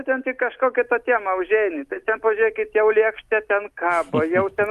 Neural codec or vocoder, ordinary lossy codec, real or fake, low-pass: none; Opus, 32 kbps; real; 14.4 kHz